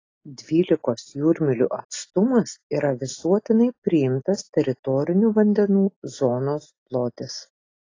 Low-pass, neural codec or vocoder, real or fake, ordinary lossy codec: 7.2 kHz; none; real; AAC, 32 kbps